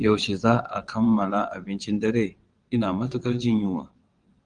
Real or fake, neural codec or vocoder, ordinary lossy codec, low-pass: fake; vocoder, 22.05 kHz, 80 mel bands, WaveNeXt; Opus, 24 kbps; 9.9 kHz